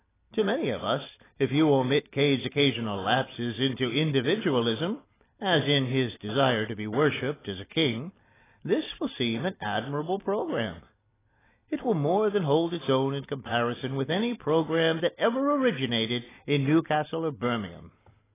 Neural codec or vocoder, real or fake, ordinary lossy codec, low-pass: none; real; AAC, 16 kbps; 3.6 kHz